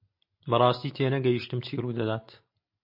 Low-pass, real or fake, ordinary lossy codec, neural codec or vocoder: 5.4 kHz; real; MP3, 32 kbps; none